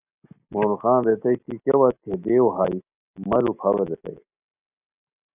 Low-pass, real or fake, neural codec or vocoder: 3.6 kHz; real; none